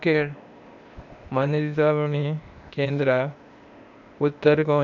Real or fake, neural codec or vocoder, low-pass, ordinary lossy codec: fake; codec, 16 kHz, 0.8 kbps, ZipCodec; 7.2 kHz; none